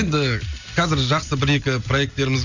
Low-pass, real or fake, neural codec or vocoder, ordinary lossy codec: 7.2 kHz; real; none; none